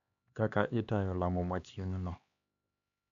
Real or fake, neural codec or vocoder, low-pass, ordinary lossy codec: fake; codec, 16 kHz, 2 kbps, X-Codec, HuBERT features, trained on LibriSpeech; 7.2 kHz; MP3, 96 kbps